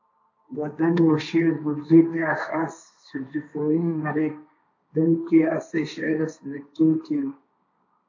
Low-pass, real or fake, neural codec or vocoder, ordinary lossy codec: 7.2 kHz; fake; codec, 16 kHz, 1.1 kbps, Voila-Tokenizer; AAC, 48 kbps